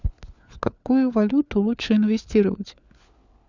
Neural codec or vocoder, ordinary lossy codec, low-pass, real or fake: codec, 16 kHz, 4 kbps, FunCodec, trained on LibriTTS, 50 frames a second; Opus, 64 kbps; 7.2 kHz; fake